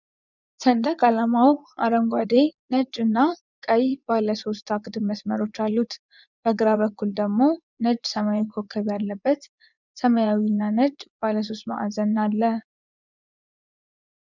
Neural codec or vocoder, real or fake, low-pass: vocoder, 24 kHz, 100 mel bands, Vocos; fake; 7.2 kHz